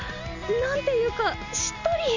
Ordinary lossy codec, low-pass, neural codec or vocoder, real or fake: none; 7.2 kHz; none; real